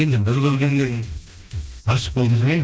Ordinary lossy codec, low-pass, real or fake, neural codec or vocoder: none; none; fake; codec, 16 kHz, 1 kbps, FreqCodec, smaller model